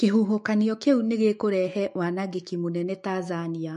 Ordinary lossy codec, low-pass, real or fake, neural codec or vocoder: MP3, 48 kbps; 14.4 kHz; fake; autoencoder, 48 kHz, 128 numbers a frame, DAC-VAE, trained on Japanese speech